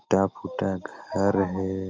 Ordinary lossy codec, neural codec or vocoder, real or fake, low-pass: Opus, 32 kbps; none; real; 7.2 kHz